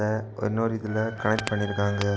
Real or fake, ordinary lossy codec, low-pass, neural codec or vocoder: real; none; none; none